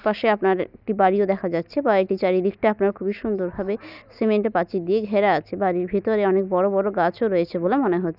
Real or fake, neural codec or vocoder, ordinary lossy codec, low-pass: real; none; none; 5.4 kHz